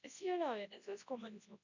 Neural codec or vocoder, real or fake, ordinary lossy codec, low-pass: codec, 24 kHz, 0.9 kbps, WavTokenizer, large speech release; fake; none; 7.2 kHz